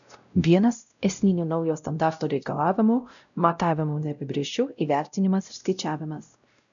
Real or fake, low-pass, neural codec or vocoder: fake; 7.2 kHz; codec, 16 kHz, 0.5 kbps, X-Codec, WavLM features, trained on Multilingual LibriSpeech